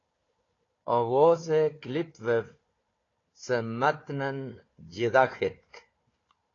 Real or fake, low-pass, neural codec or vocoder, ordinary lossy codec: fake; 7.2 kHz; codec, 16 kHz, 4 kbps, FunCodec, trained on Chinese and English, 50 frames a second; AAC, 32 kbps